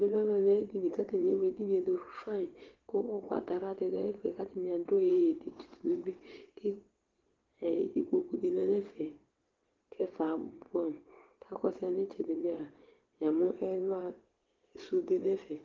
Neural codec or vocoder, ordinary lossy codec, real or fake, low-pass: vocoder, 22.05 kHz, 80 mel bands, WaveNeXt; Opus, 16 kbps; fake; 7.2 kHz